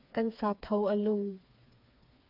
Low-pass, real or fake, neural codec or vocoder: 5.4 kHz; fake; codec, 16 kHz, 4 kbps, FreqCodec, smaller model